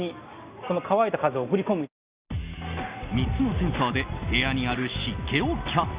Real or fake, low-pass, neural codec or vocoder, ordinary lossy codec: real; 3.6 kHz; none; Opus, 64 kbps